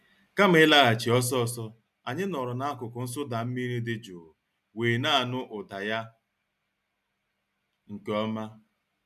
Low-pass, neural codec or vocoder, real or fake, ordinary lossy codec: 14.4 kHz; none; real; none